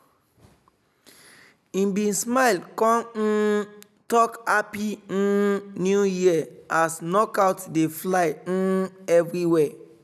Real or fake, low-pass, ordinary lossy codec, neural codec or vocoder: real; 14.4 kHz; none; none